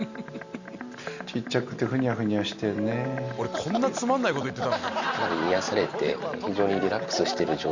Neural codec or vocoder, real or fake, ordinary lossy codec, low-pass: none; real; Opus, 64 kbps; 7.2 kHz